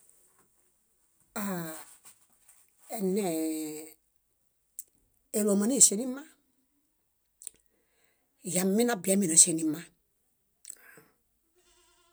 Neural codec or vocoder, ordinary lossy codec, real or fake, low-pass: none; none; real; none